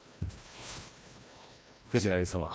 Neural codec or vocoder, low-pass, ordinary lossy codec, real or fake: codec, 16 kHz, 1 kbps, FreqCodec, larger model; none; none; fake